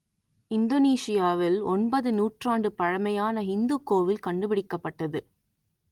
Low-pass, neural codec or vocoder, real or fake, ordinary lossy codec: 19.8 kHz; none; real; Opus, 24 kbps